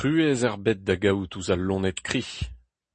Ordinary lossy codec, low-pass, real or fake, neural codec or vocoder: MP3, 32 kbps; 10.8 kHz; real; none